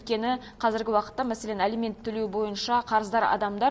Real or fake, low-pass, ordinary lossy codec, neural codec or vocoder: real; none; none; none